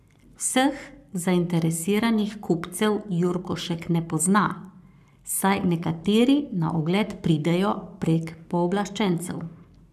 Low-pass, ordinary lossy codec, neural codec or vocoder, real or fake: 14.4 kHz; none; codec, 44.1 kHz, 7.8 kbps, Pupu-Codec; fake